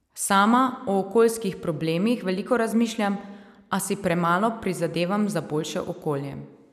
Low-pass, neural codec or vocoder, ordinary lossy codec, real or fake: 14.4 kHz; none; none; real